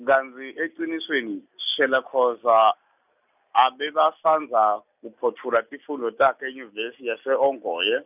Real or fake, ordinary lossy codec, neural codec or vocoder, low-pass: real; none; none; 3.6 kHz